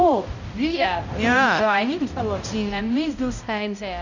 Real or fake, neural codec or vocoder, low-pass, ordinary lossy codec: fake; codec, 16 kHz, 0.5 kbps, X-Codec, HuBERT features, trained on balanced general audio; 7.2 kHz; none